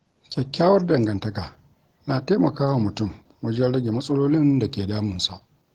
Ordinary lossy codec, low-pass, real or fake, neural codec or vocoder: Opus, 16 kbps; 19.8 kHz; real; none